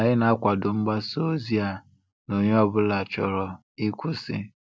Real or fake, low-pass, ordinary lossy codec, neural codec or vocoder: real; none; none; none